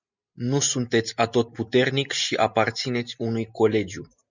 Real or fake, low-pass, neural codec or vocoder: real; 7.2 kHz; none